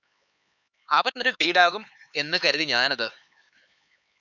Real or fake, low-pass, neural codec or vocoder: fake; 7.2 kHz; codec, 16 kHz, 4 kbps, X-Codec, HuBERT features, trained on LibriSpeech